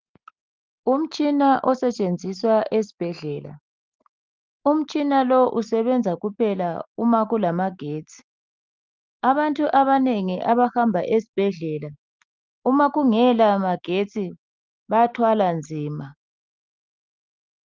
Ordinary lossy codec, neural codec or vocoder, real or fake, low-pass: Opus, 24 kbps; none; real; 7.2 kHz